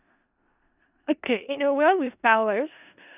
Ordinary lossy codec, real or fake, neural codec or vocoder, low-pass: none; fake; codec, 16 kHz in and 24 kHz out, 0.4 kbps, LongCat-Audio-Codec, four codebook decoder; 3.6 kHz